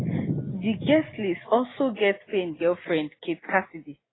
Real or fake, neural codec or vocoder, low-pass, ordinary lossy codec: real; none; 7.2 kHz; AAC, 16 kbps